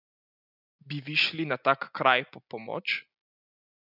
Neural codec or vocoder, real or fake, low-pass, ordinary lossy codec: none; real; 5.4 kHz; none